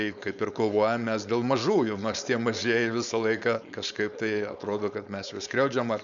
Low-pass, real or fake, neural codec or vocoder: 7.2 kHz; fake; codec, 16 kHz, 4.8 kbps, FACodec